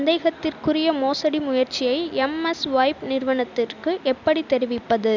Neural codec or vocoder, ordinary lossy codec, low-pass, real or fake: none; none; 7.2 kHz; real